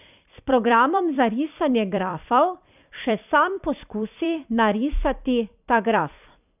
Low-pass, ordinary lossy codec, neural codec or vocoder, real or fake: 3.6 kHz; none; vocoder, 44.1 kHz, 128 mel bands every 256 samples, BigVGAN v2; fake